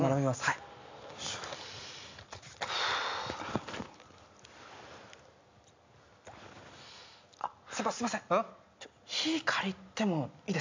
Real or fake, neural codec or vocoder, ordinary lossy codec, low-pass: real; none; MP3, 64 kbps; 7.2 kHz